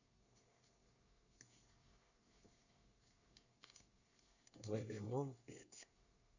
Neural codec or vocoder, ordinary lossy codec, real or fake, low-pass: codec, 24 kHz, 1 kbps, SNAC; none; fake; 7.2 kHz